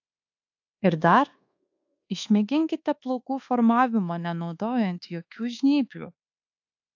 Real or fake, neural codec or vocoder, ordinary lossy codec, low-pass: fake; codec, 24 kHz, 1.2 kbps, DualCodec; AAC, 48 kbps; 7.2 kHz